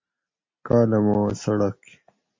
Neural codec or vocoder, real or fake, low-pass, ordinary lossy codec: none; real; 7.2 kHz; MP3, 32 kbps